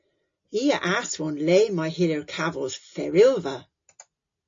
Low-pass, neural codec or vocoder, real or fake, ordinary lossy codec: 7.2 kHz; none; real; AAC, 48 kbps